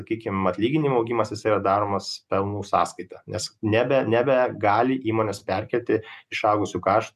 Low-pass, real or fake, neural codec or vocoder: 14.4 kHz; real; none